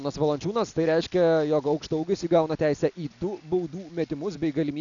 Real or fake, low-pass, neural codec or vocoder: real; 7.2 kHz; none